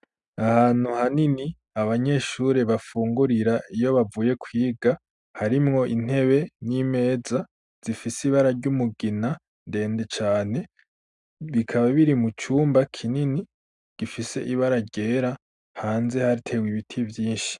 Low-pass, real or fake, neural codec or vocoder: 10.8 kHz; real; none